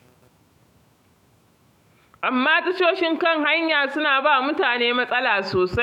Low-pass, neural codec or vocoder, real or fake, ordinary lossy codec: 19.8 kHz; autoencoder, 48 kHz, 128 numbers a frame, DAC-VAE, trained on Japanese speech; fake; none